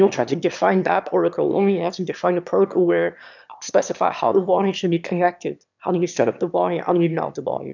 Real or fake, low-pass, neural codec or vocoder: fake; 7.2 kHz; autoencoder, 22.05 kHz, a latent of 192 numbers a frame, VITS, trained on one speaker